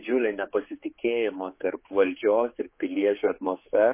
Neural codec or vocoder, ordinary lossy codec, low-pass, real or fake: codec, 16 kHz, 4 kbps, X-Codec, HuBERT features, trained on general audio; MP3, 24 kbps; 3.6 kHz; fake